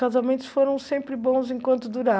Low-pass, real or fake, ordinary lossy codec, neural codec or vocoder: none; real; none; none